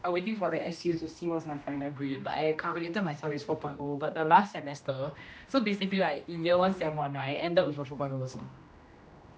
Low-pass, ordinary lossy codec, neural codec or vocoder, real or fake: none; none; codec, 16 kHz, 1 kbps, X-Codec, HuBERT features, trained on general audio; fake